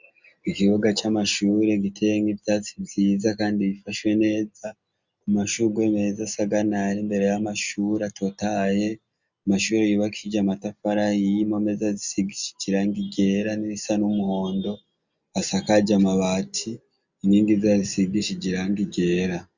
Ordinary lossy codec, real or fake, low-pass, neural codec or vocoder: Opus, 64 kbps; real; 7.2 kHz; none